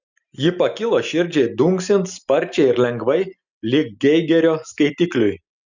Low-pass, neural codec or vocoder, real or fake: 7.2 kHz; none; real